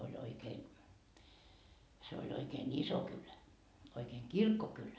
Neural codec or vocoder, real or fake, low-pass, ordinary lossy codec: none; real; none; none